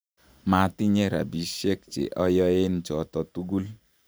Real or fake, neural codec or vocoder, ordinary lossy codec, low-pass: fake; vocoder, 44.1 kHz, 128 mel bands every 512 samples, BigVGAN v2; none; none